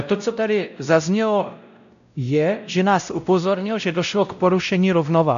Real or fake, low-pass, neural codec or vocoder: fake; 7.2 kHz; codec, 16 kHz, 0.5 kbps, X-Codec, WavLM features, trained on Multilingual LibriSpeech